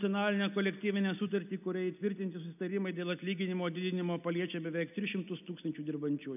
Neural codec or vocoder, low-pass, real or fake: none; 3.6 kHz; real